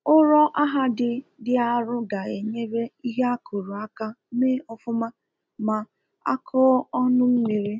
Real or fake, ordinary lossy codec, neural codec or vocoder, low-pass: real; none; none; none